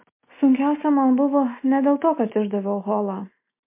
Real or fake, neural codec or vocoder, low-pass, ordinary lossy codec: real; none; 3.6 kHz; MP3, 24 kbps